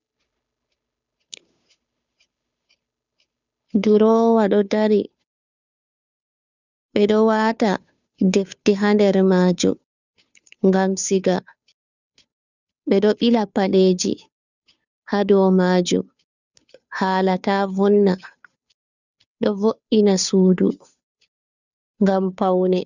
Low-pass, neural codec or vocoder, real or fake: 7.2 kHz; codec, 16 kHz, 2 kbps, FunCodec, trained on Chinese and English, 25 frames a second; fake